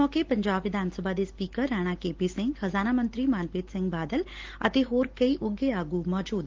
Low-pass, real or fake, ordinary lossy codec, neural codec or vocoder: 7.2 kHz; real; Opus, 16 kbps; none